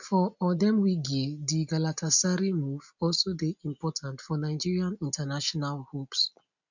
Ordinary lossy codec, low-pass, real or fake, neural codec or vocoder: none; 7.2 kHz; real; none